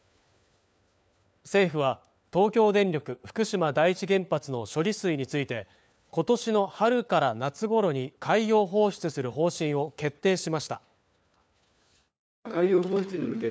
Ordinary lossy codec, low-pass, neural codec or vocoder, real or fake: none; none; codec, 16 kHz, 4 kbps, FunCodec, trained on LibriTTS, 50 frames a second; fake